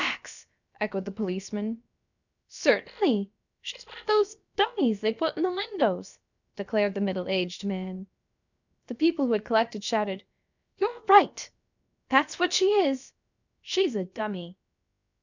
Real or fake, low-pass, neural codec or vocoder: fake; 7.2 kHz; codec, 16 kHz, about 1 kbps, DyCAST, with the encoder's durations